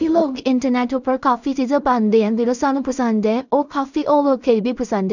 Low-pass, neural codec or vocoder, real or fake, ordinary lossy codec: 7.2 kHz; codec, 16 kHz in and 24 kHz out, 0.4 kbps, LongCat-Audio-Codec, two codebook decoder; fake; none